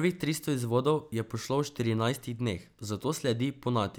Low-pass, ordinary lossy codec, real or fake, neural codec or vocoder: none; none; real; none